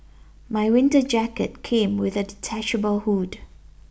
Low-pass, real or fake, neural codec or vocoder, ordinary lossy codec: none; real; none; none